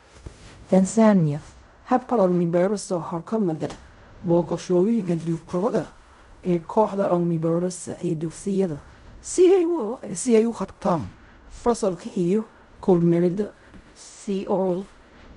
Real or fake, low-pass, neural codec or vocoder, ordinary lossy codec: fake; 10.8 kHz; codec, 16 kHz in and 24 kHz out, 0.4 kbps, LongCat-Audio-Codec, fine tuned four codebook decoder; MP3, 96 kbps